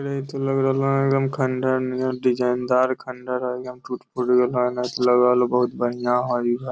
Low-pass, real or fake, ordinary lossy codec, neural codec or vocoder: none; real; none; none